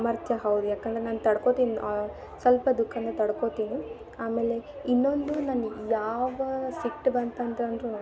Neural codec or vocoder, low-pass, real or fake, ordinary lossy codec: none; none; real; none